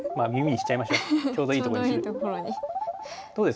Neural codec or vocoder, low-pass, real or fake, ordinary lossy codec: none; none; real; none